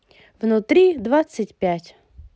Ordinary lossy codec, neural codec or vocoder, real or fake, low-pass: none; none; real; none